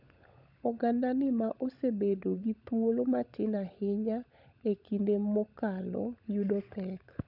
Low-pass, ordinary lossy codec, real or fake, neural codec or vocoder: 5.4 kHz; none; fake; codec, 16 kHz, 16 kbps, FunCodec, trained on LibriTTS, 50 frames a second